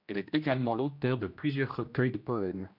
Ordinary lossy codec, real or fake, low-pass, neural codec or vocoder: MP3, 48 kbps; fake; 5.4 kHz; codec, 16 kHz, 1 kbps, X-Codec, HuBERT features, trained on general audio